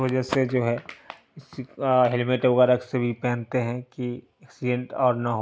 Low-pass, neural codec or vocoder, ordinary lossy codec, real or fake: none; none; none; real